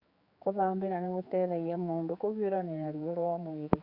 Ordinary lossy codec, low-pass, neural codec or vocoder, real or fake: Opus, 64 kbps; 5.4 kHz; codec, 16 kHz, 4 kbps, X-Codec, HuBERT features, trained on general audio; fake